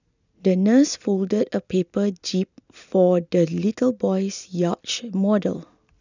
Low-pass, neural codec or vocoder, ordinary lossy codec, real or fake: 7.2 kHz; none; none; real